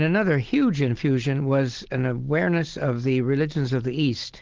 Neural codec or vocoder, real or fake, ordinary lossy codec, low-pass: none; real; Opus, 16 kbps; 7.2 kHz